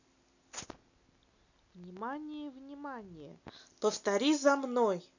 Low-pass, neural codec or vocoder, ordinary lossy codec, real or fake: 7.2 kHz; none; none; real